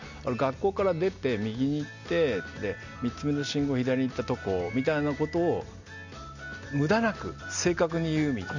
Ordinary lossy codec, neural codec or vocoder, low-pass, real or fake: none; none; 7.2 kHz; real